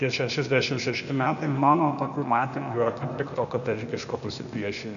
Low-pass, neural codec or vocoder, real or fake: 7.2 kHz; codec, 16 kHz, 0.8 kbps, ZipCodec; fake